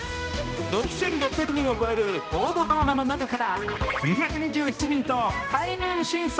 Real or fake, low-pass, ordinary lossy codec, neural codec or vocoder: fake; none; none; codec, 16 kHz, 1 kbps, X-Codec, HuBERT features, trained on balanced general audio